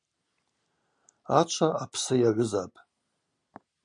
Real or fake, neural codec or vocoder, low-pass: real; none; 9.9 kHz